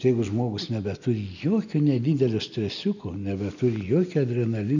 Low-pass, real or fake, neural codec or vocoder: 7.2 kHz; real; none